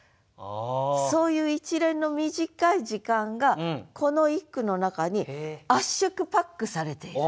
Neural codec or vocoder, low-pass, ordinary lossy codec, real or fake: none; none; none; real